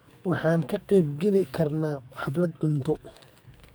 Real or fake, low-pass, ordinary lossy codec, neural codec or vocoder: fake; none; none; codec, 44.1 kHz, 2.6 kbps, SNAC